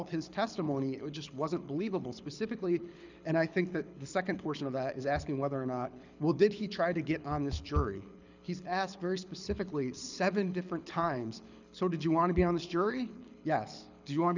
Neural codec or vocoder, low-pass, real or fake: codec, 24 kHz, 6 kbps, HILCodec; 7.2 kHz; fake